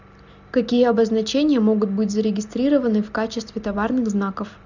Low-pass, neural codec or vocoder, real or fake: 7.2 kHz; none; real